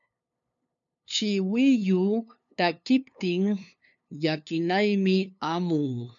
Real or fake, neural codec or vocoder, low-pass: fake; codec, 16 kHz, 2 kbps, FunCodec, trained on LibriTTS, 25 frames a second; 7.2 kHz